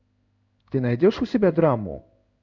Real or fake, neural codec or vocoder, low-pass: fake; codec, 16 kHz in and 24 kHz out, 1 kbps, XY-Tokenizer; 7.2 kHz